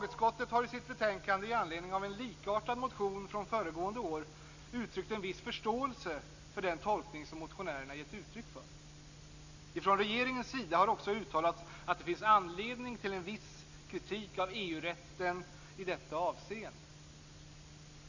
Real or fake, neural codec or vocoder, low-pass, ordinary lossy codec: real; none; 7.2 kHz; Opus, 64 kbps